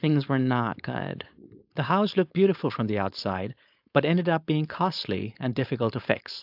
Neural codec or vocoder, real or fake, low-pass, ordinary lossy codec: codec, 16 kHz, 4.8 kbps, FACodec; fake; 5.4 kHz; AAC, 48 kbps